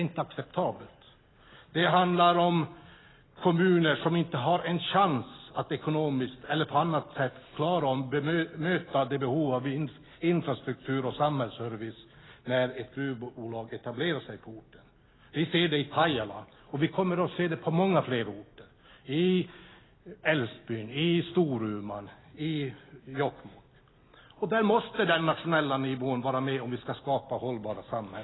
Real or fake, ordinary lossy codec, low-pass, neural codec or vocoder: real; AAC, 16 kbps; 7.2 kHz; none